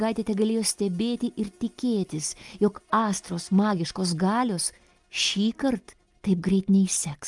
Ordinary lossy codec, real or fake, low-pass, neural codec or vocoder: Opus, 32 kbps; real; 10.8 kHz; none